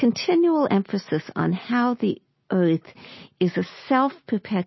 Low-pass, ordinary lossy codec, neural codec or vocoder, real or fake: 7.2 kHz; MP3, 24 kbps; none; real